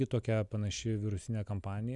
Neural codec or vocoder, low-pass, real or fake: none; 10.8 kHz; real